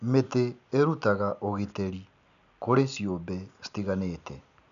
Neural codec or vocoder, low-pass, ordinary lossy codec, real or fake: none; 7.2 kHz; none; real